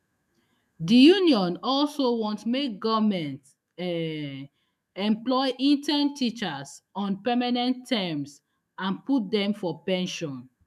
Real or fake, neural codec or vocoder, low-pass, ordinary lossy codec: fake; autoencoder, 48 kHz, 128 numbers a frame, DAC-VAE, trained on Japanese speech; 14.4 kHz; MP3, 96 kbps